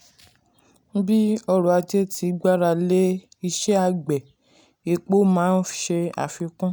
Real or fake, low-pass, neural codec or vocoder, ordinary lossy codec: real; none; none; none